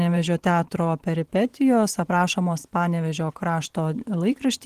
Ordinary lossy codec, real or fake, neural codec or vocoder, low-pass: Opus, 16 kbps; fake; vocoder, 44.1 kHz, 128 mel bands every 512 samples, BigVGAN v2; 14.4 kHz